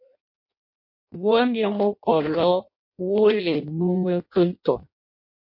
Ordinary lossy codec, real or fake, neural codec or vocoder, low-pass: MP3, 32 kbps; fake; codec, 16 kHz in and 24 kHz out, 0.6 kbps, FireRedTTS-2 codec; 5.4 kHz